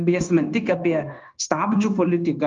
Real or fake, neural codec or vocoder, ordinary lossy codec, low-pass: fake; codec, 16 kHz, 0.9 kbps, LongCat-Audio-Codec; Opus, 32 kbps; 7.2 kHz